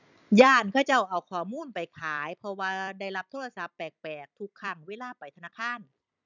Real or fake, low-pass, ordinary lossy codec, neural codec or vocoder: fake; 7.2 kHz; none; vocoder, 44.1 kHz, 128 mel bands every 256 samples, BigVGAN v2